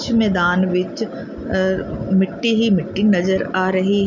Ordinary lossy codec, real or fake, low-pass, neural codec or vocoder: none; real; 7.2 kHz; none